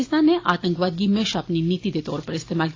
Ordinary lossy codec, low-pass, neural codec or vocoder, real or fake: AAC, 32 kbps; 7.2 kHz; none; real